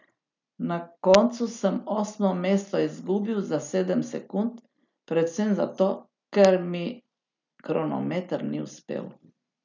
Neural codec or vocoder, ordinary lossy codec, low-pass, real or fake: none; none; 7.2 kHz; real